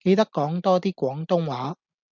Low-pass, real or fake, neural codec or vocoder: 7.2 kHz; real; none